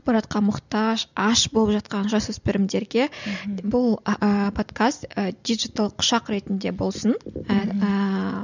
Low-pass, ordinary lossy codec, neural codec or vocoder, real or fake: 7.2 kHz; none; none; real